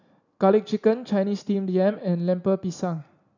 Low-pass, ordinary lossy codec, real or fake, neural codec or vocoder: 7.2 kHz; none; real; none